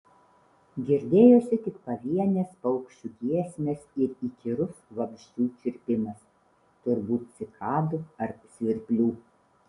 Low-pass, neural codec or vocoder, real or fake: 10.8 kHz; none; real